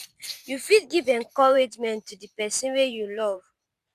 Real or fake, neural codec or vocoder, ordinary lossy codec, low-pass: fake; vocoder, 44.1 kHz, 128 mel bands every 512 samples, BigVGAN v2; Opus, 64 kbps; 14.4 kHz